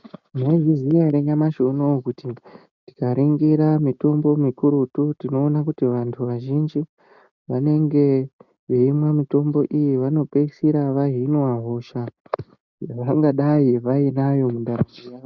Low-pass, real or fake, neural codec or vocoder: 7.2 kHz; real; none